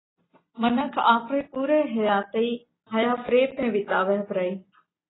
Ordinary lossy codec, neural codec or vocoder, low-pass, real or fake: AAC, 16 kbps; none; 7.2 kHz; real